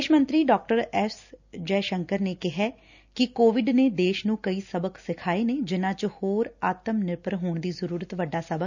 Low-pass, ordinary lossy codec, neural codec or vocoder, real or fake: 7.2 kHz; none; none; real